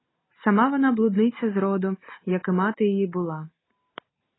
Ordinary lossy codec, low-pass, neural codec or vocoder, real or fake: AAC, 16 kbps; 7.2 kHz; none; real